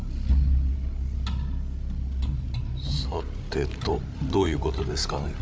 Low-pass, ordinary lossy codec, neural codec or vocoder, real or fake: none; none; codec, 16 kHz, 16 kbps, FreqCodec, larger model; fake